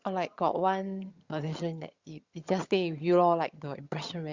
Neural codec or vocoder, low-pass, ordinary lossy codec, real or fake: vocoder, 22.05 kHz, 80 mel bands, HiFi-GAN; 7.2 kHz; Opus, 64 kbps; fake